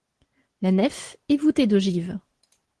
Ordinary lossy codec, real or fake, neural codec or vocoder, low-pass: Opus, 16 kbps; real; none; 10.8 kHz